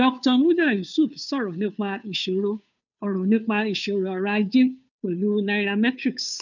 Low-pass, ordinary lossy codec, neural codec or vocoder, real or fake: 7.2 kHz; none; codec, 16 kHz, 2 kbps, FunCodec, trained on Chinese and English, 25 frames a second; fake